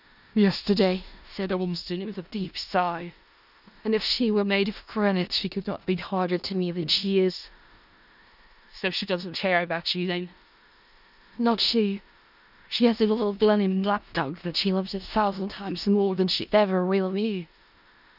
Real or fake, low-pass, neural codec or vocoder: fake; 5.4 kHz; codec, 16 kHz in and 24 kHz out, 0.4 kbps, LongCat-Audio-Codec, four codebook decoder